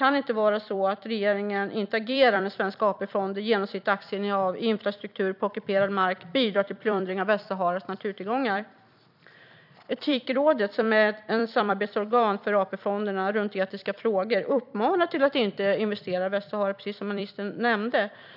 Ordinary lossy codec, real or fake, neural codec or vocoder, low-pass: none; fake; vocoder, 44.1 kHz, 128 mel bands every 256 samples, BigVGAN v2; 5.4 kHz